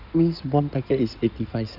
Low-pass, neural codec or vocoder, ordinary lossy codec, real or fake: 5.4 kHz; codec, 16 kHz in and 24 kHz out, 2.2 kbps, FireRedTTS-2 codec; none; fake